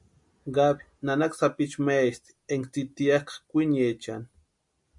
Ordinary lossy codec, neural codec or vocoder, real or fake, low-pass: MP3, 64 kbps; none; real; 10.8 kHz